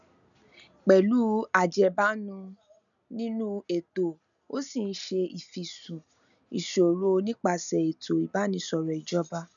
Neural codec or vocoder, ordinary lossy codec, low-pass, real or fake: none; none; 7.2 kHz; real